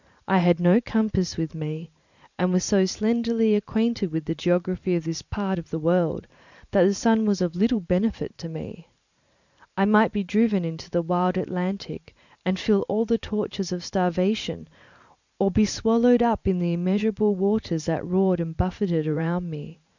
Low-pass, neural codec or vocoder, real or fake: 7.2 kHz; vocoder, 44.1 kHz, 128 mel bands every 512 samples, BigVGAN v2; fake